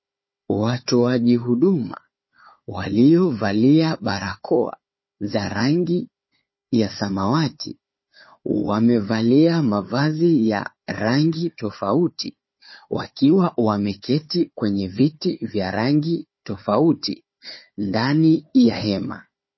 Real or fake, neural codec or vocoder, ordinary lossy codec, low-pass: fake; codec, 16 kHz, 4 kbps, FunCodec, trained on Chinese and English, 50 frames a second; MP3, 24 kbps; 7.2 kHz